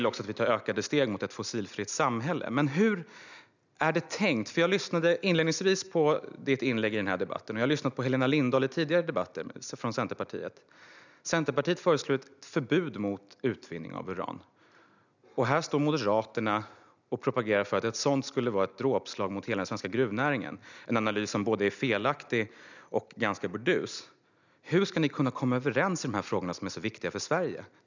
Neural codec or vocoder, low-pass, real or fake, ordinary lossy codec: none; 7.2 kHz; real; none